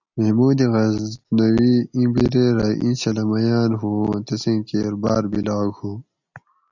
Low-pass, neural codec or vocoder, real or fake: 7.2 kHz; none; real